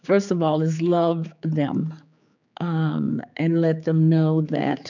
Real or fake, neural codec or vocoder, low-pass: fake; codec, 16 kHz, 4 kbps, X-Codec, HuBERT features, trained on general audio; 7.2 kHz